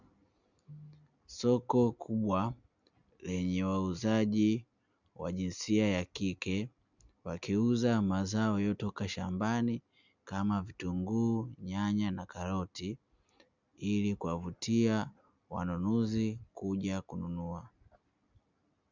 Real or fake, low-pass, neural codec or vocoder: real; 7.2 kHz; none